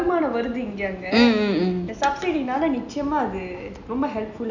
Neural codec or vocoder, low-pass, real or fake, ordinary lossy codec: none; 7.2 kHz; real; none